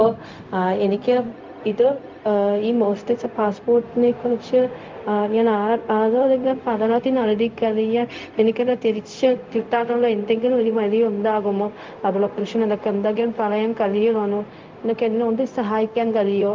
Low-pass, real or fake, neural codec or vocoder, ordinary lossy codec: 7.2 kHz; fake; codec, 16 kHz, 0.4 kbps, LongCat-Audio-Codec; Opus, 24 kbps